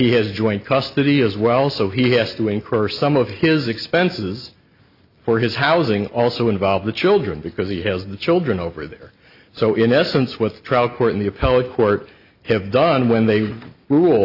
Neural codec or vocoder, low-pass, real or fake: none; 5.4 kHz; real